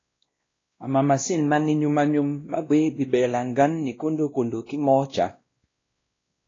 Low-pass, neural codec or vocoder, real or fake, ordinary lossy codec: 7.2 kHz; codec, 16 kHz, 1 kbps, X-Codec, WavLM features, trained on Multilingual LibriSpeech; fake; AAC, 32 kbps